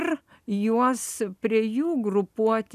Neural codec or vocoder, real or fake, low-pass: none; real; 14.4 kHz